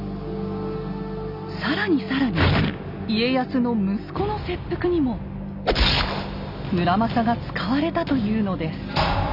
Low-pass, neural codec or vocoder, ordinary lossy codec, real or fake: 5.4 kHz; none; none; real